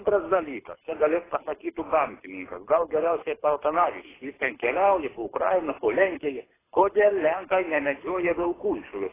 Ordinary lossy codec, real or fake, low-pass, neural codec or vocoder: AAC, 16 kbps; fake; 3.6 kHz; codec, 24 kHz, 6 kbps, HILCodec